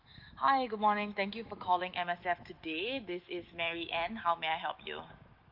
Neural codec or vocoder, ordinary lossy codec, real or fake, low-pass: autoencoder, 48 kHz, 128 numbers a frame, DAC-VAE, trained on Japanese speech; Opus, 32 kbps; fake; 5.4 kHz